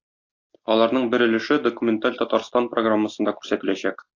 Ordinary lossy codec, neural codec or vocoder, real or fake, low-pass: AAC, 48 kbps; none; real; 7.2 kHz